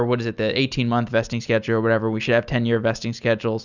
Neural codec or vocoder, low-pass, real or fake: none; 7.2 kHz; real